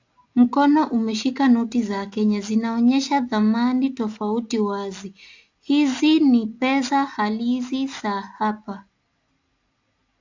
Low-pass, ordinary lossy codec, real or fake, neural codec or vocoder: 7.2 kHz; AAC, 48 kbps; real; none